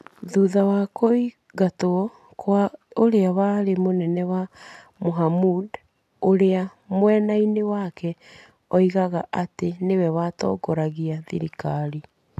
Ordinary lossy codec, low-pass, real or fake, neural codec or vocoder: none; 14.4 kHz; real; none